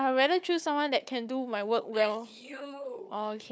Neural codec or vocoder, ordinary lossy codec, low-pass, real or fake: codec, 16 kHz, 4 kbps, FunCodec, trained on Chinese and English, 50 frames a second; none; none; fake